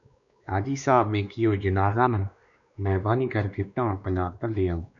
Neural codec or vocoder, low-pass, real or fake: codec, 16 kHz, 2 kbps, X-Codec, WavLM features, trained on Multilingual LibriSpeech; 7.2 kHz; fake